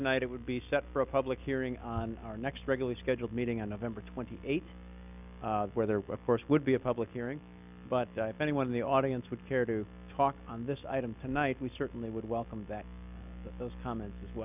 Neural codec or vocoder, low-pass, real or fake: none; 3.6 kHz; real